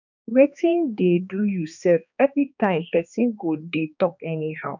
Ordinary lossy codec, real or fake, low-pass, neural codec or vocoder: none; fake; 7.2 kHz; codec, 16 kHz, 2 kbps, X-Codec, HuBERT features, trained on balanced general audio